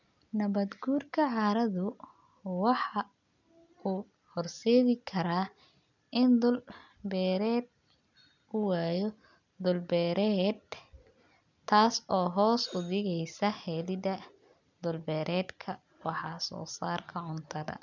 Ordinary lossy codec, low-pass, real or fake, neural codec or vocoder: none; 7.2 kHz; real; none